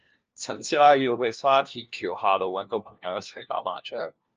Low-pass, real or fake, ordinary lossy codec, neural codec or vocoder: 7.2 kHz; fake; Opus, 24 kbps; codec, 16 kHz, 1 kbps, FunCodec, trained on LibriTTS, 50 frames a second